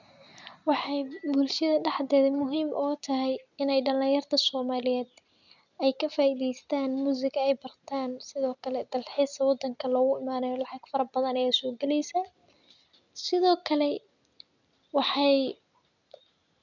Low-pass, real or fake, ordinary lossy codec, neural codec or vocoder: 7.2 kHz; real; none; none